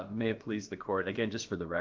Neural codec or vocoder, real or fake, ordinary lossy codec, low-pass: codec, 16 kHz, about 1 kbps, DyCAST, with the encoder's durations; fake; Opus, 32 kbps; 7.2 kHz